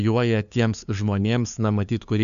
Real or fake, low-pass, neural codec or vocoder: fake; 7.2 kHz; codec, 16 kHz, 2 kbps, FunCodec, trained on LibriTTS, 25 frames a second